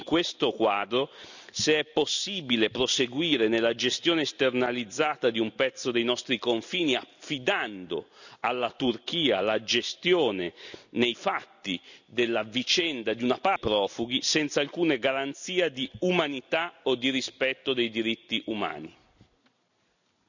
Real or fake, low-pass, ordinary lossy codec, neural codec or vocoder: real; 7.2 kHz; none; none